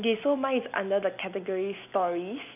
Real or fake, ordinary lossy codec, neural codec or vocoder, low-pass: real; none; none; 3.6 kHz